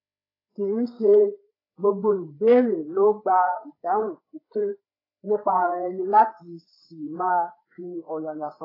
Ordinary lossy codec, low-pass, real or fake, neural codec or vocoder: AAC, 24 kbps; 5.4 kHz; fake; codec, 16 kHz, 4 kbps, FreqCodec, larger model